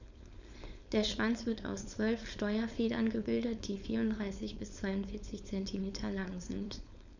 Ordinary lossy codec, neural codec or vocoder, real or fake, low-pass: none; codec, 16 kHz, 4.8 kbps, FACodec; fake; 7.2 kHz